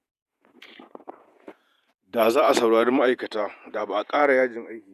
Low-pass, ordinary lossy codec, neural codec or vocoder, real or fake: 14.4 kHz; none; vocoder, 48 kHz, 128 mel bands, Vocos; fake